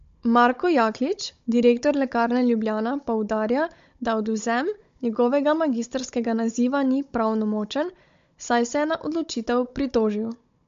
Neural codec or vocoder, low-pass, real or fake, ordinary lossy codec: codec, 16 kHz, 16 kbps, FunCodec, trained on Chinese and English, 50 frames a second; 7.2 kHz; fake; MP3, 48 kbps